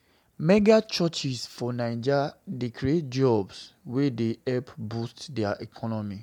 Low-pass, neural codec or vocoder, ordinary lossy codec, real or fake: 19.8 kHz; none; MP3, 96 kbps; real